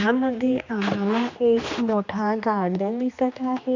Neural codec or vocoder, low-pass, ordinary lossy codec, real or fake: codec, 16 kHz, 2 kbps, X-Codec, HuBERT features, trained on general audio; 7.2 kHz; MP3, 64 kbps; fake